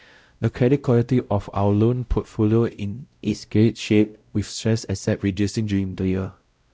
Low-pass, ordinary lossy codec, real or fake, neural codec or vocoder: none; none; fake; codec, 16 kHz, 0.5 kbps, X-Codec, WavLM features, trained on Multilingual LibriSpeech